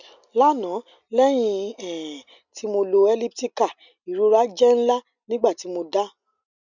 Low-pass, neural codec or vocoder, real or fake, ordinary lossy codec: 7.2 kHz; none; real; none